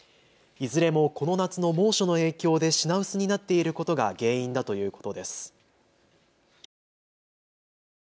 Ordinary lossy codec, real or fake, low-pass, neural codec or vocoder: none; real; none; none